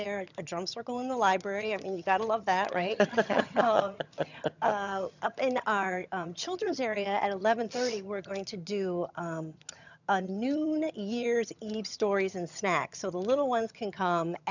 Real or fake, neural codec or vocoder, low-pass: fake; vocoder, 22.05 kHz, 80 mel bands, HiFi-GAN; 7.2 kHz